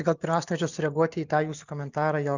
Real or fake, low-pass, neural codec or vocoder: real; 7.2 kHz; none